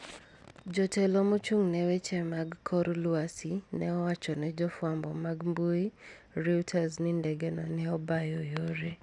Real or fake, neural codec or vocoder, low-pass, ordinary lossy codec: real; none; 10.8 kHz; none